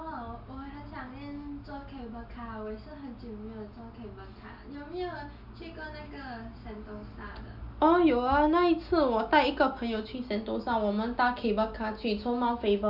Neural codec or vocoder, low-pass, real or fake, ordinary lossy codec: none; 5.4 kHz; real; none